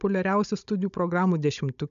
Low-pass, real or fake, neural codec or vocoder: 7.2 kHz; fake; codec, 16 kHz, 16 kbps, FunCodec, trained on Chinese and English, 50 frames a second